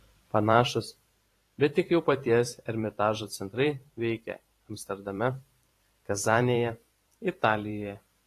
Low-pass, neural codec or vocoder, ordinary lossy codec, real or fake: 14.4 kHz; vocoder, 44.1 kHz, 128 mel bands every 512 samples, BigVGAN v2; AAC, 48 kbps; fake